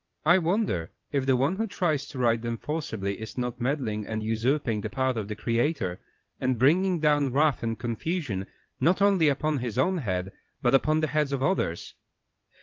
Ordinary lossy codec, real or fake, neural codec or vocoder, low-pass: Opus, 24 kbps; fake; vocoder, 22.05 kHz, 80 mel bands, WaveNeXt; 7.2 kHz